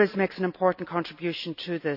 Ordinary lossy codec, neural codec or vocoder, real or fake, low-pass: none; none; real; 5.4 kHz